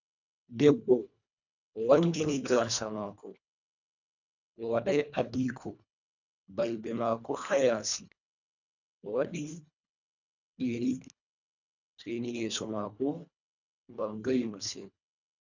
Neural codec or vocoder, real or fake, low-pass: codec, 24 kHz, 1.5 kbps, HILCodec; fake; 7.2 kHz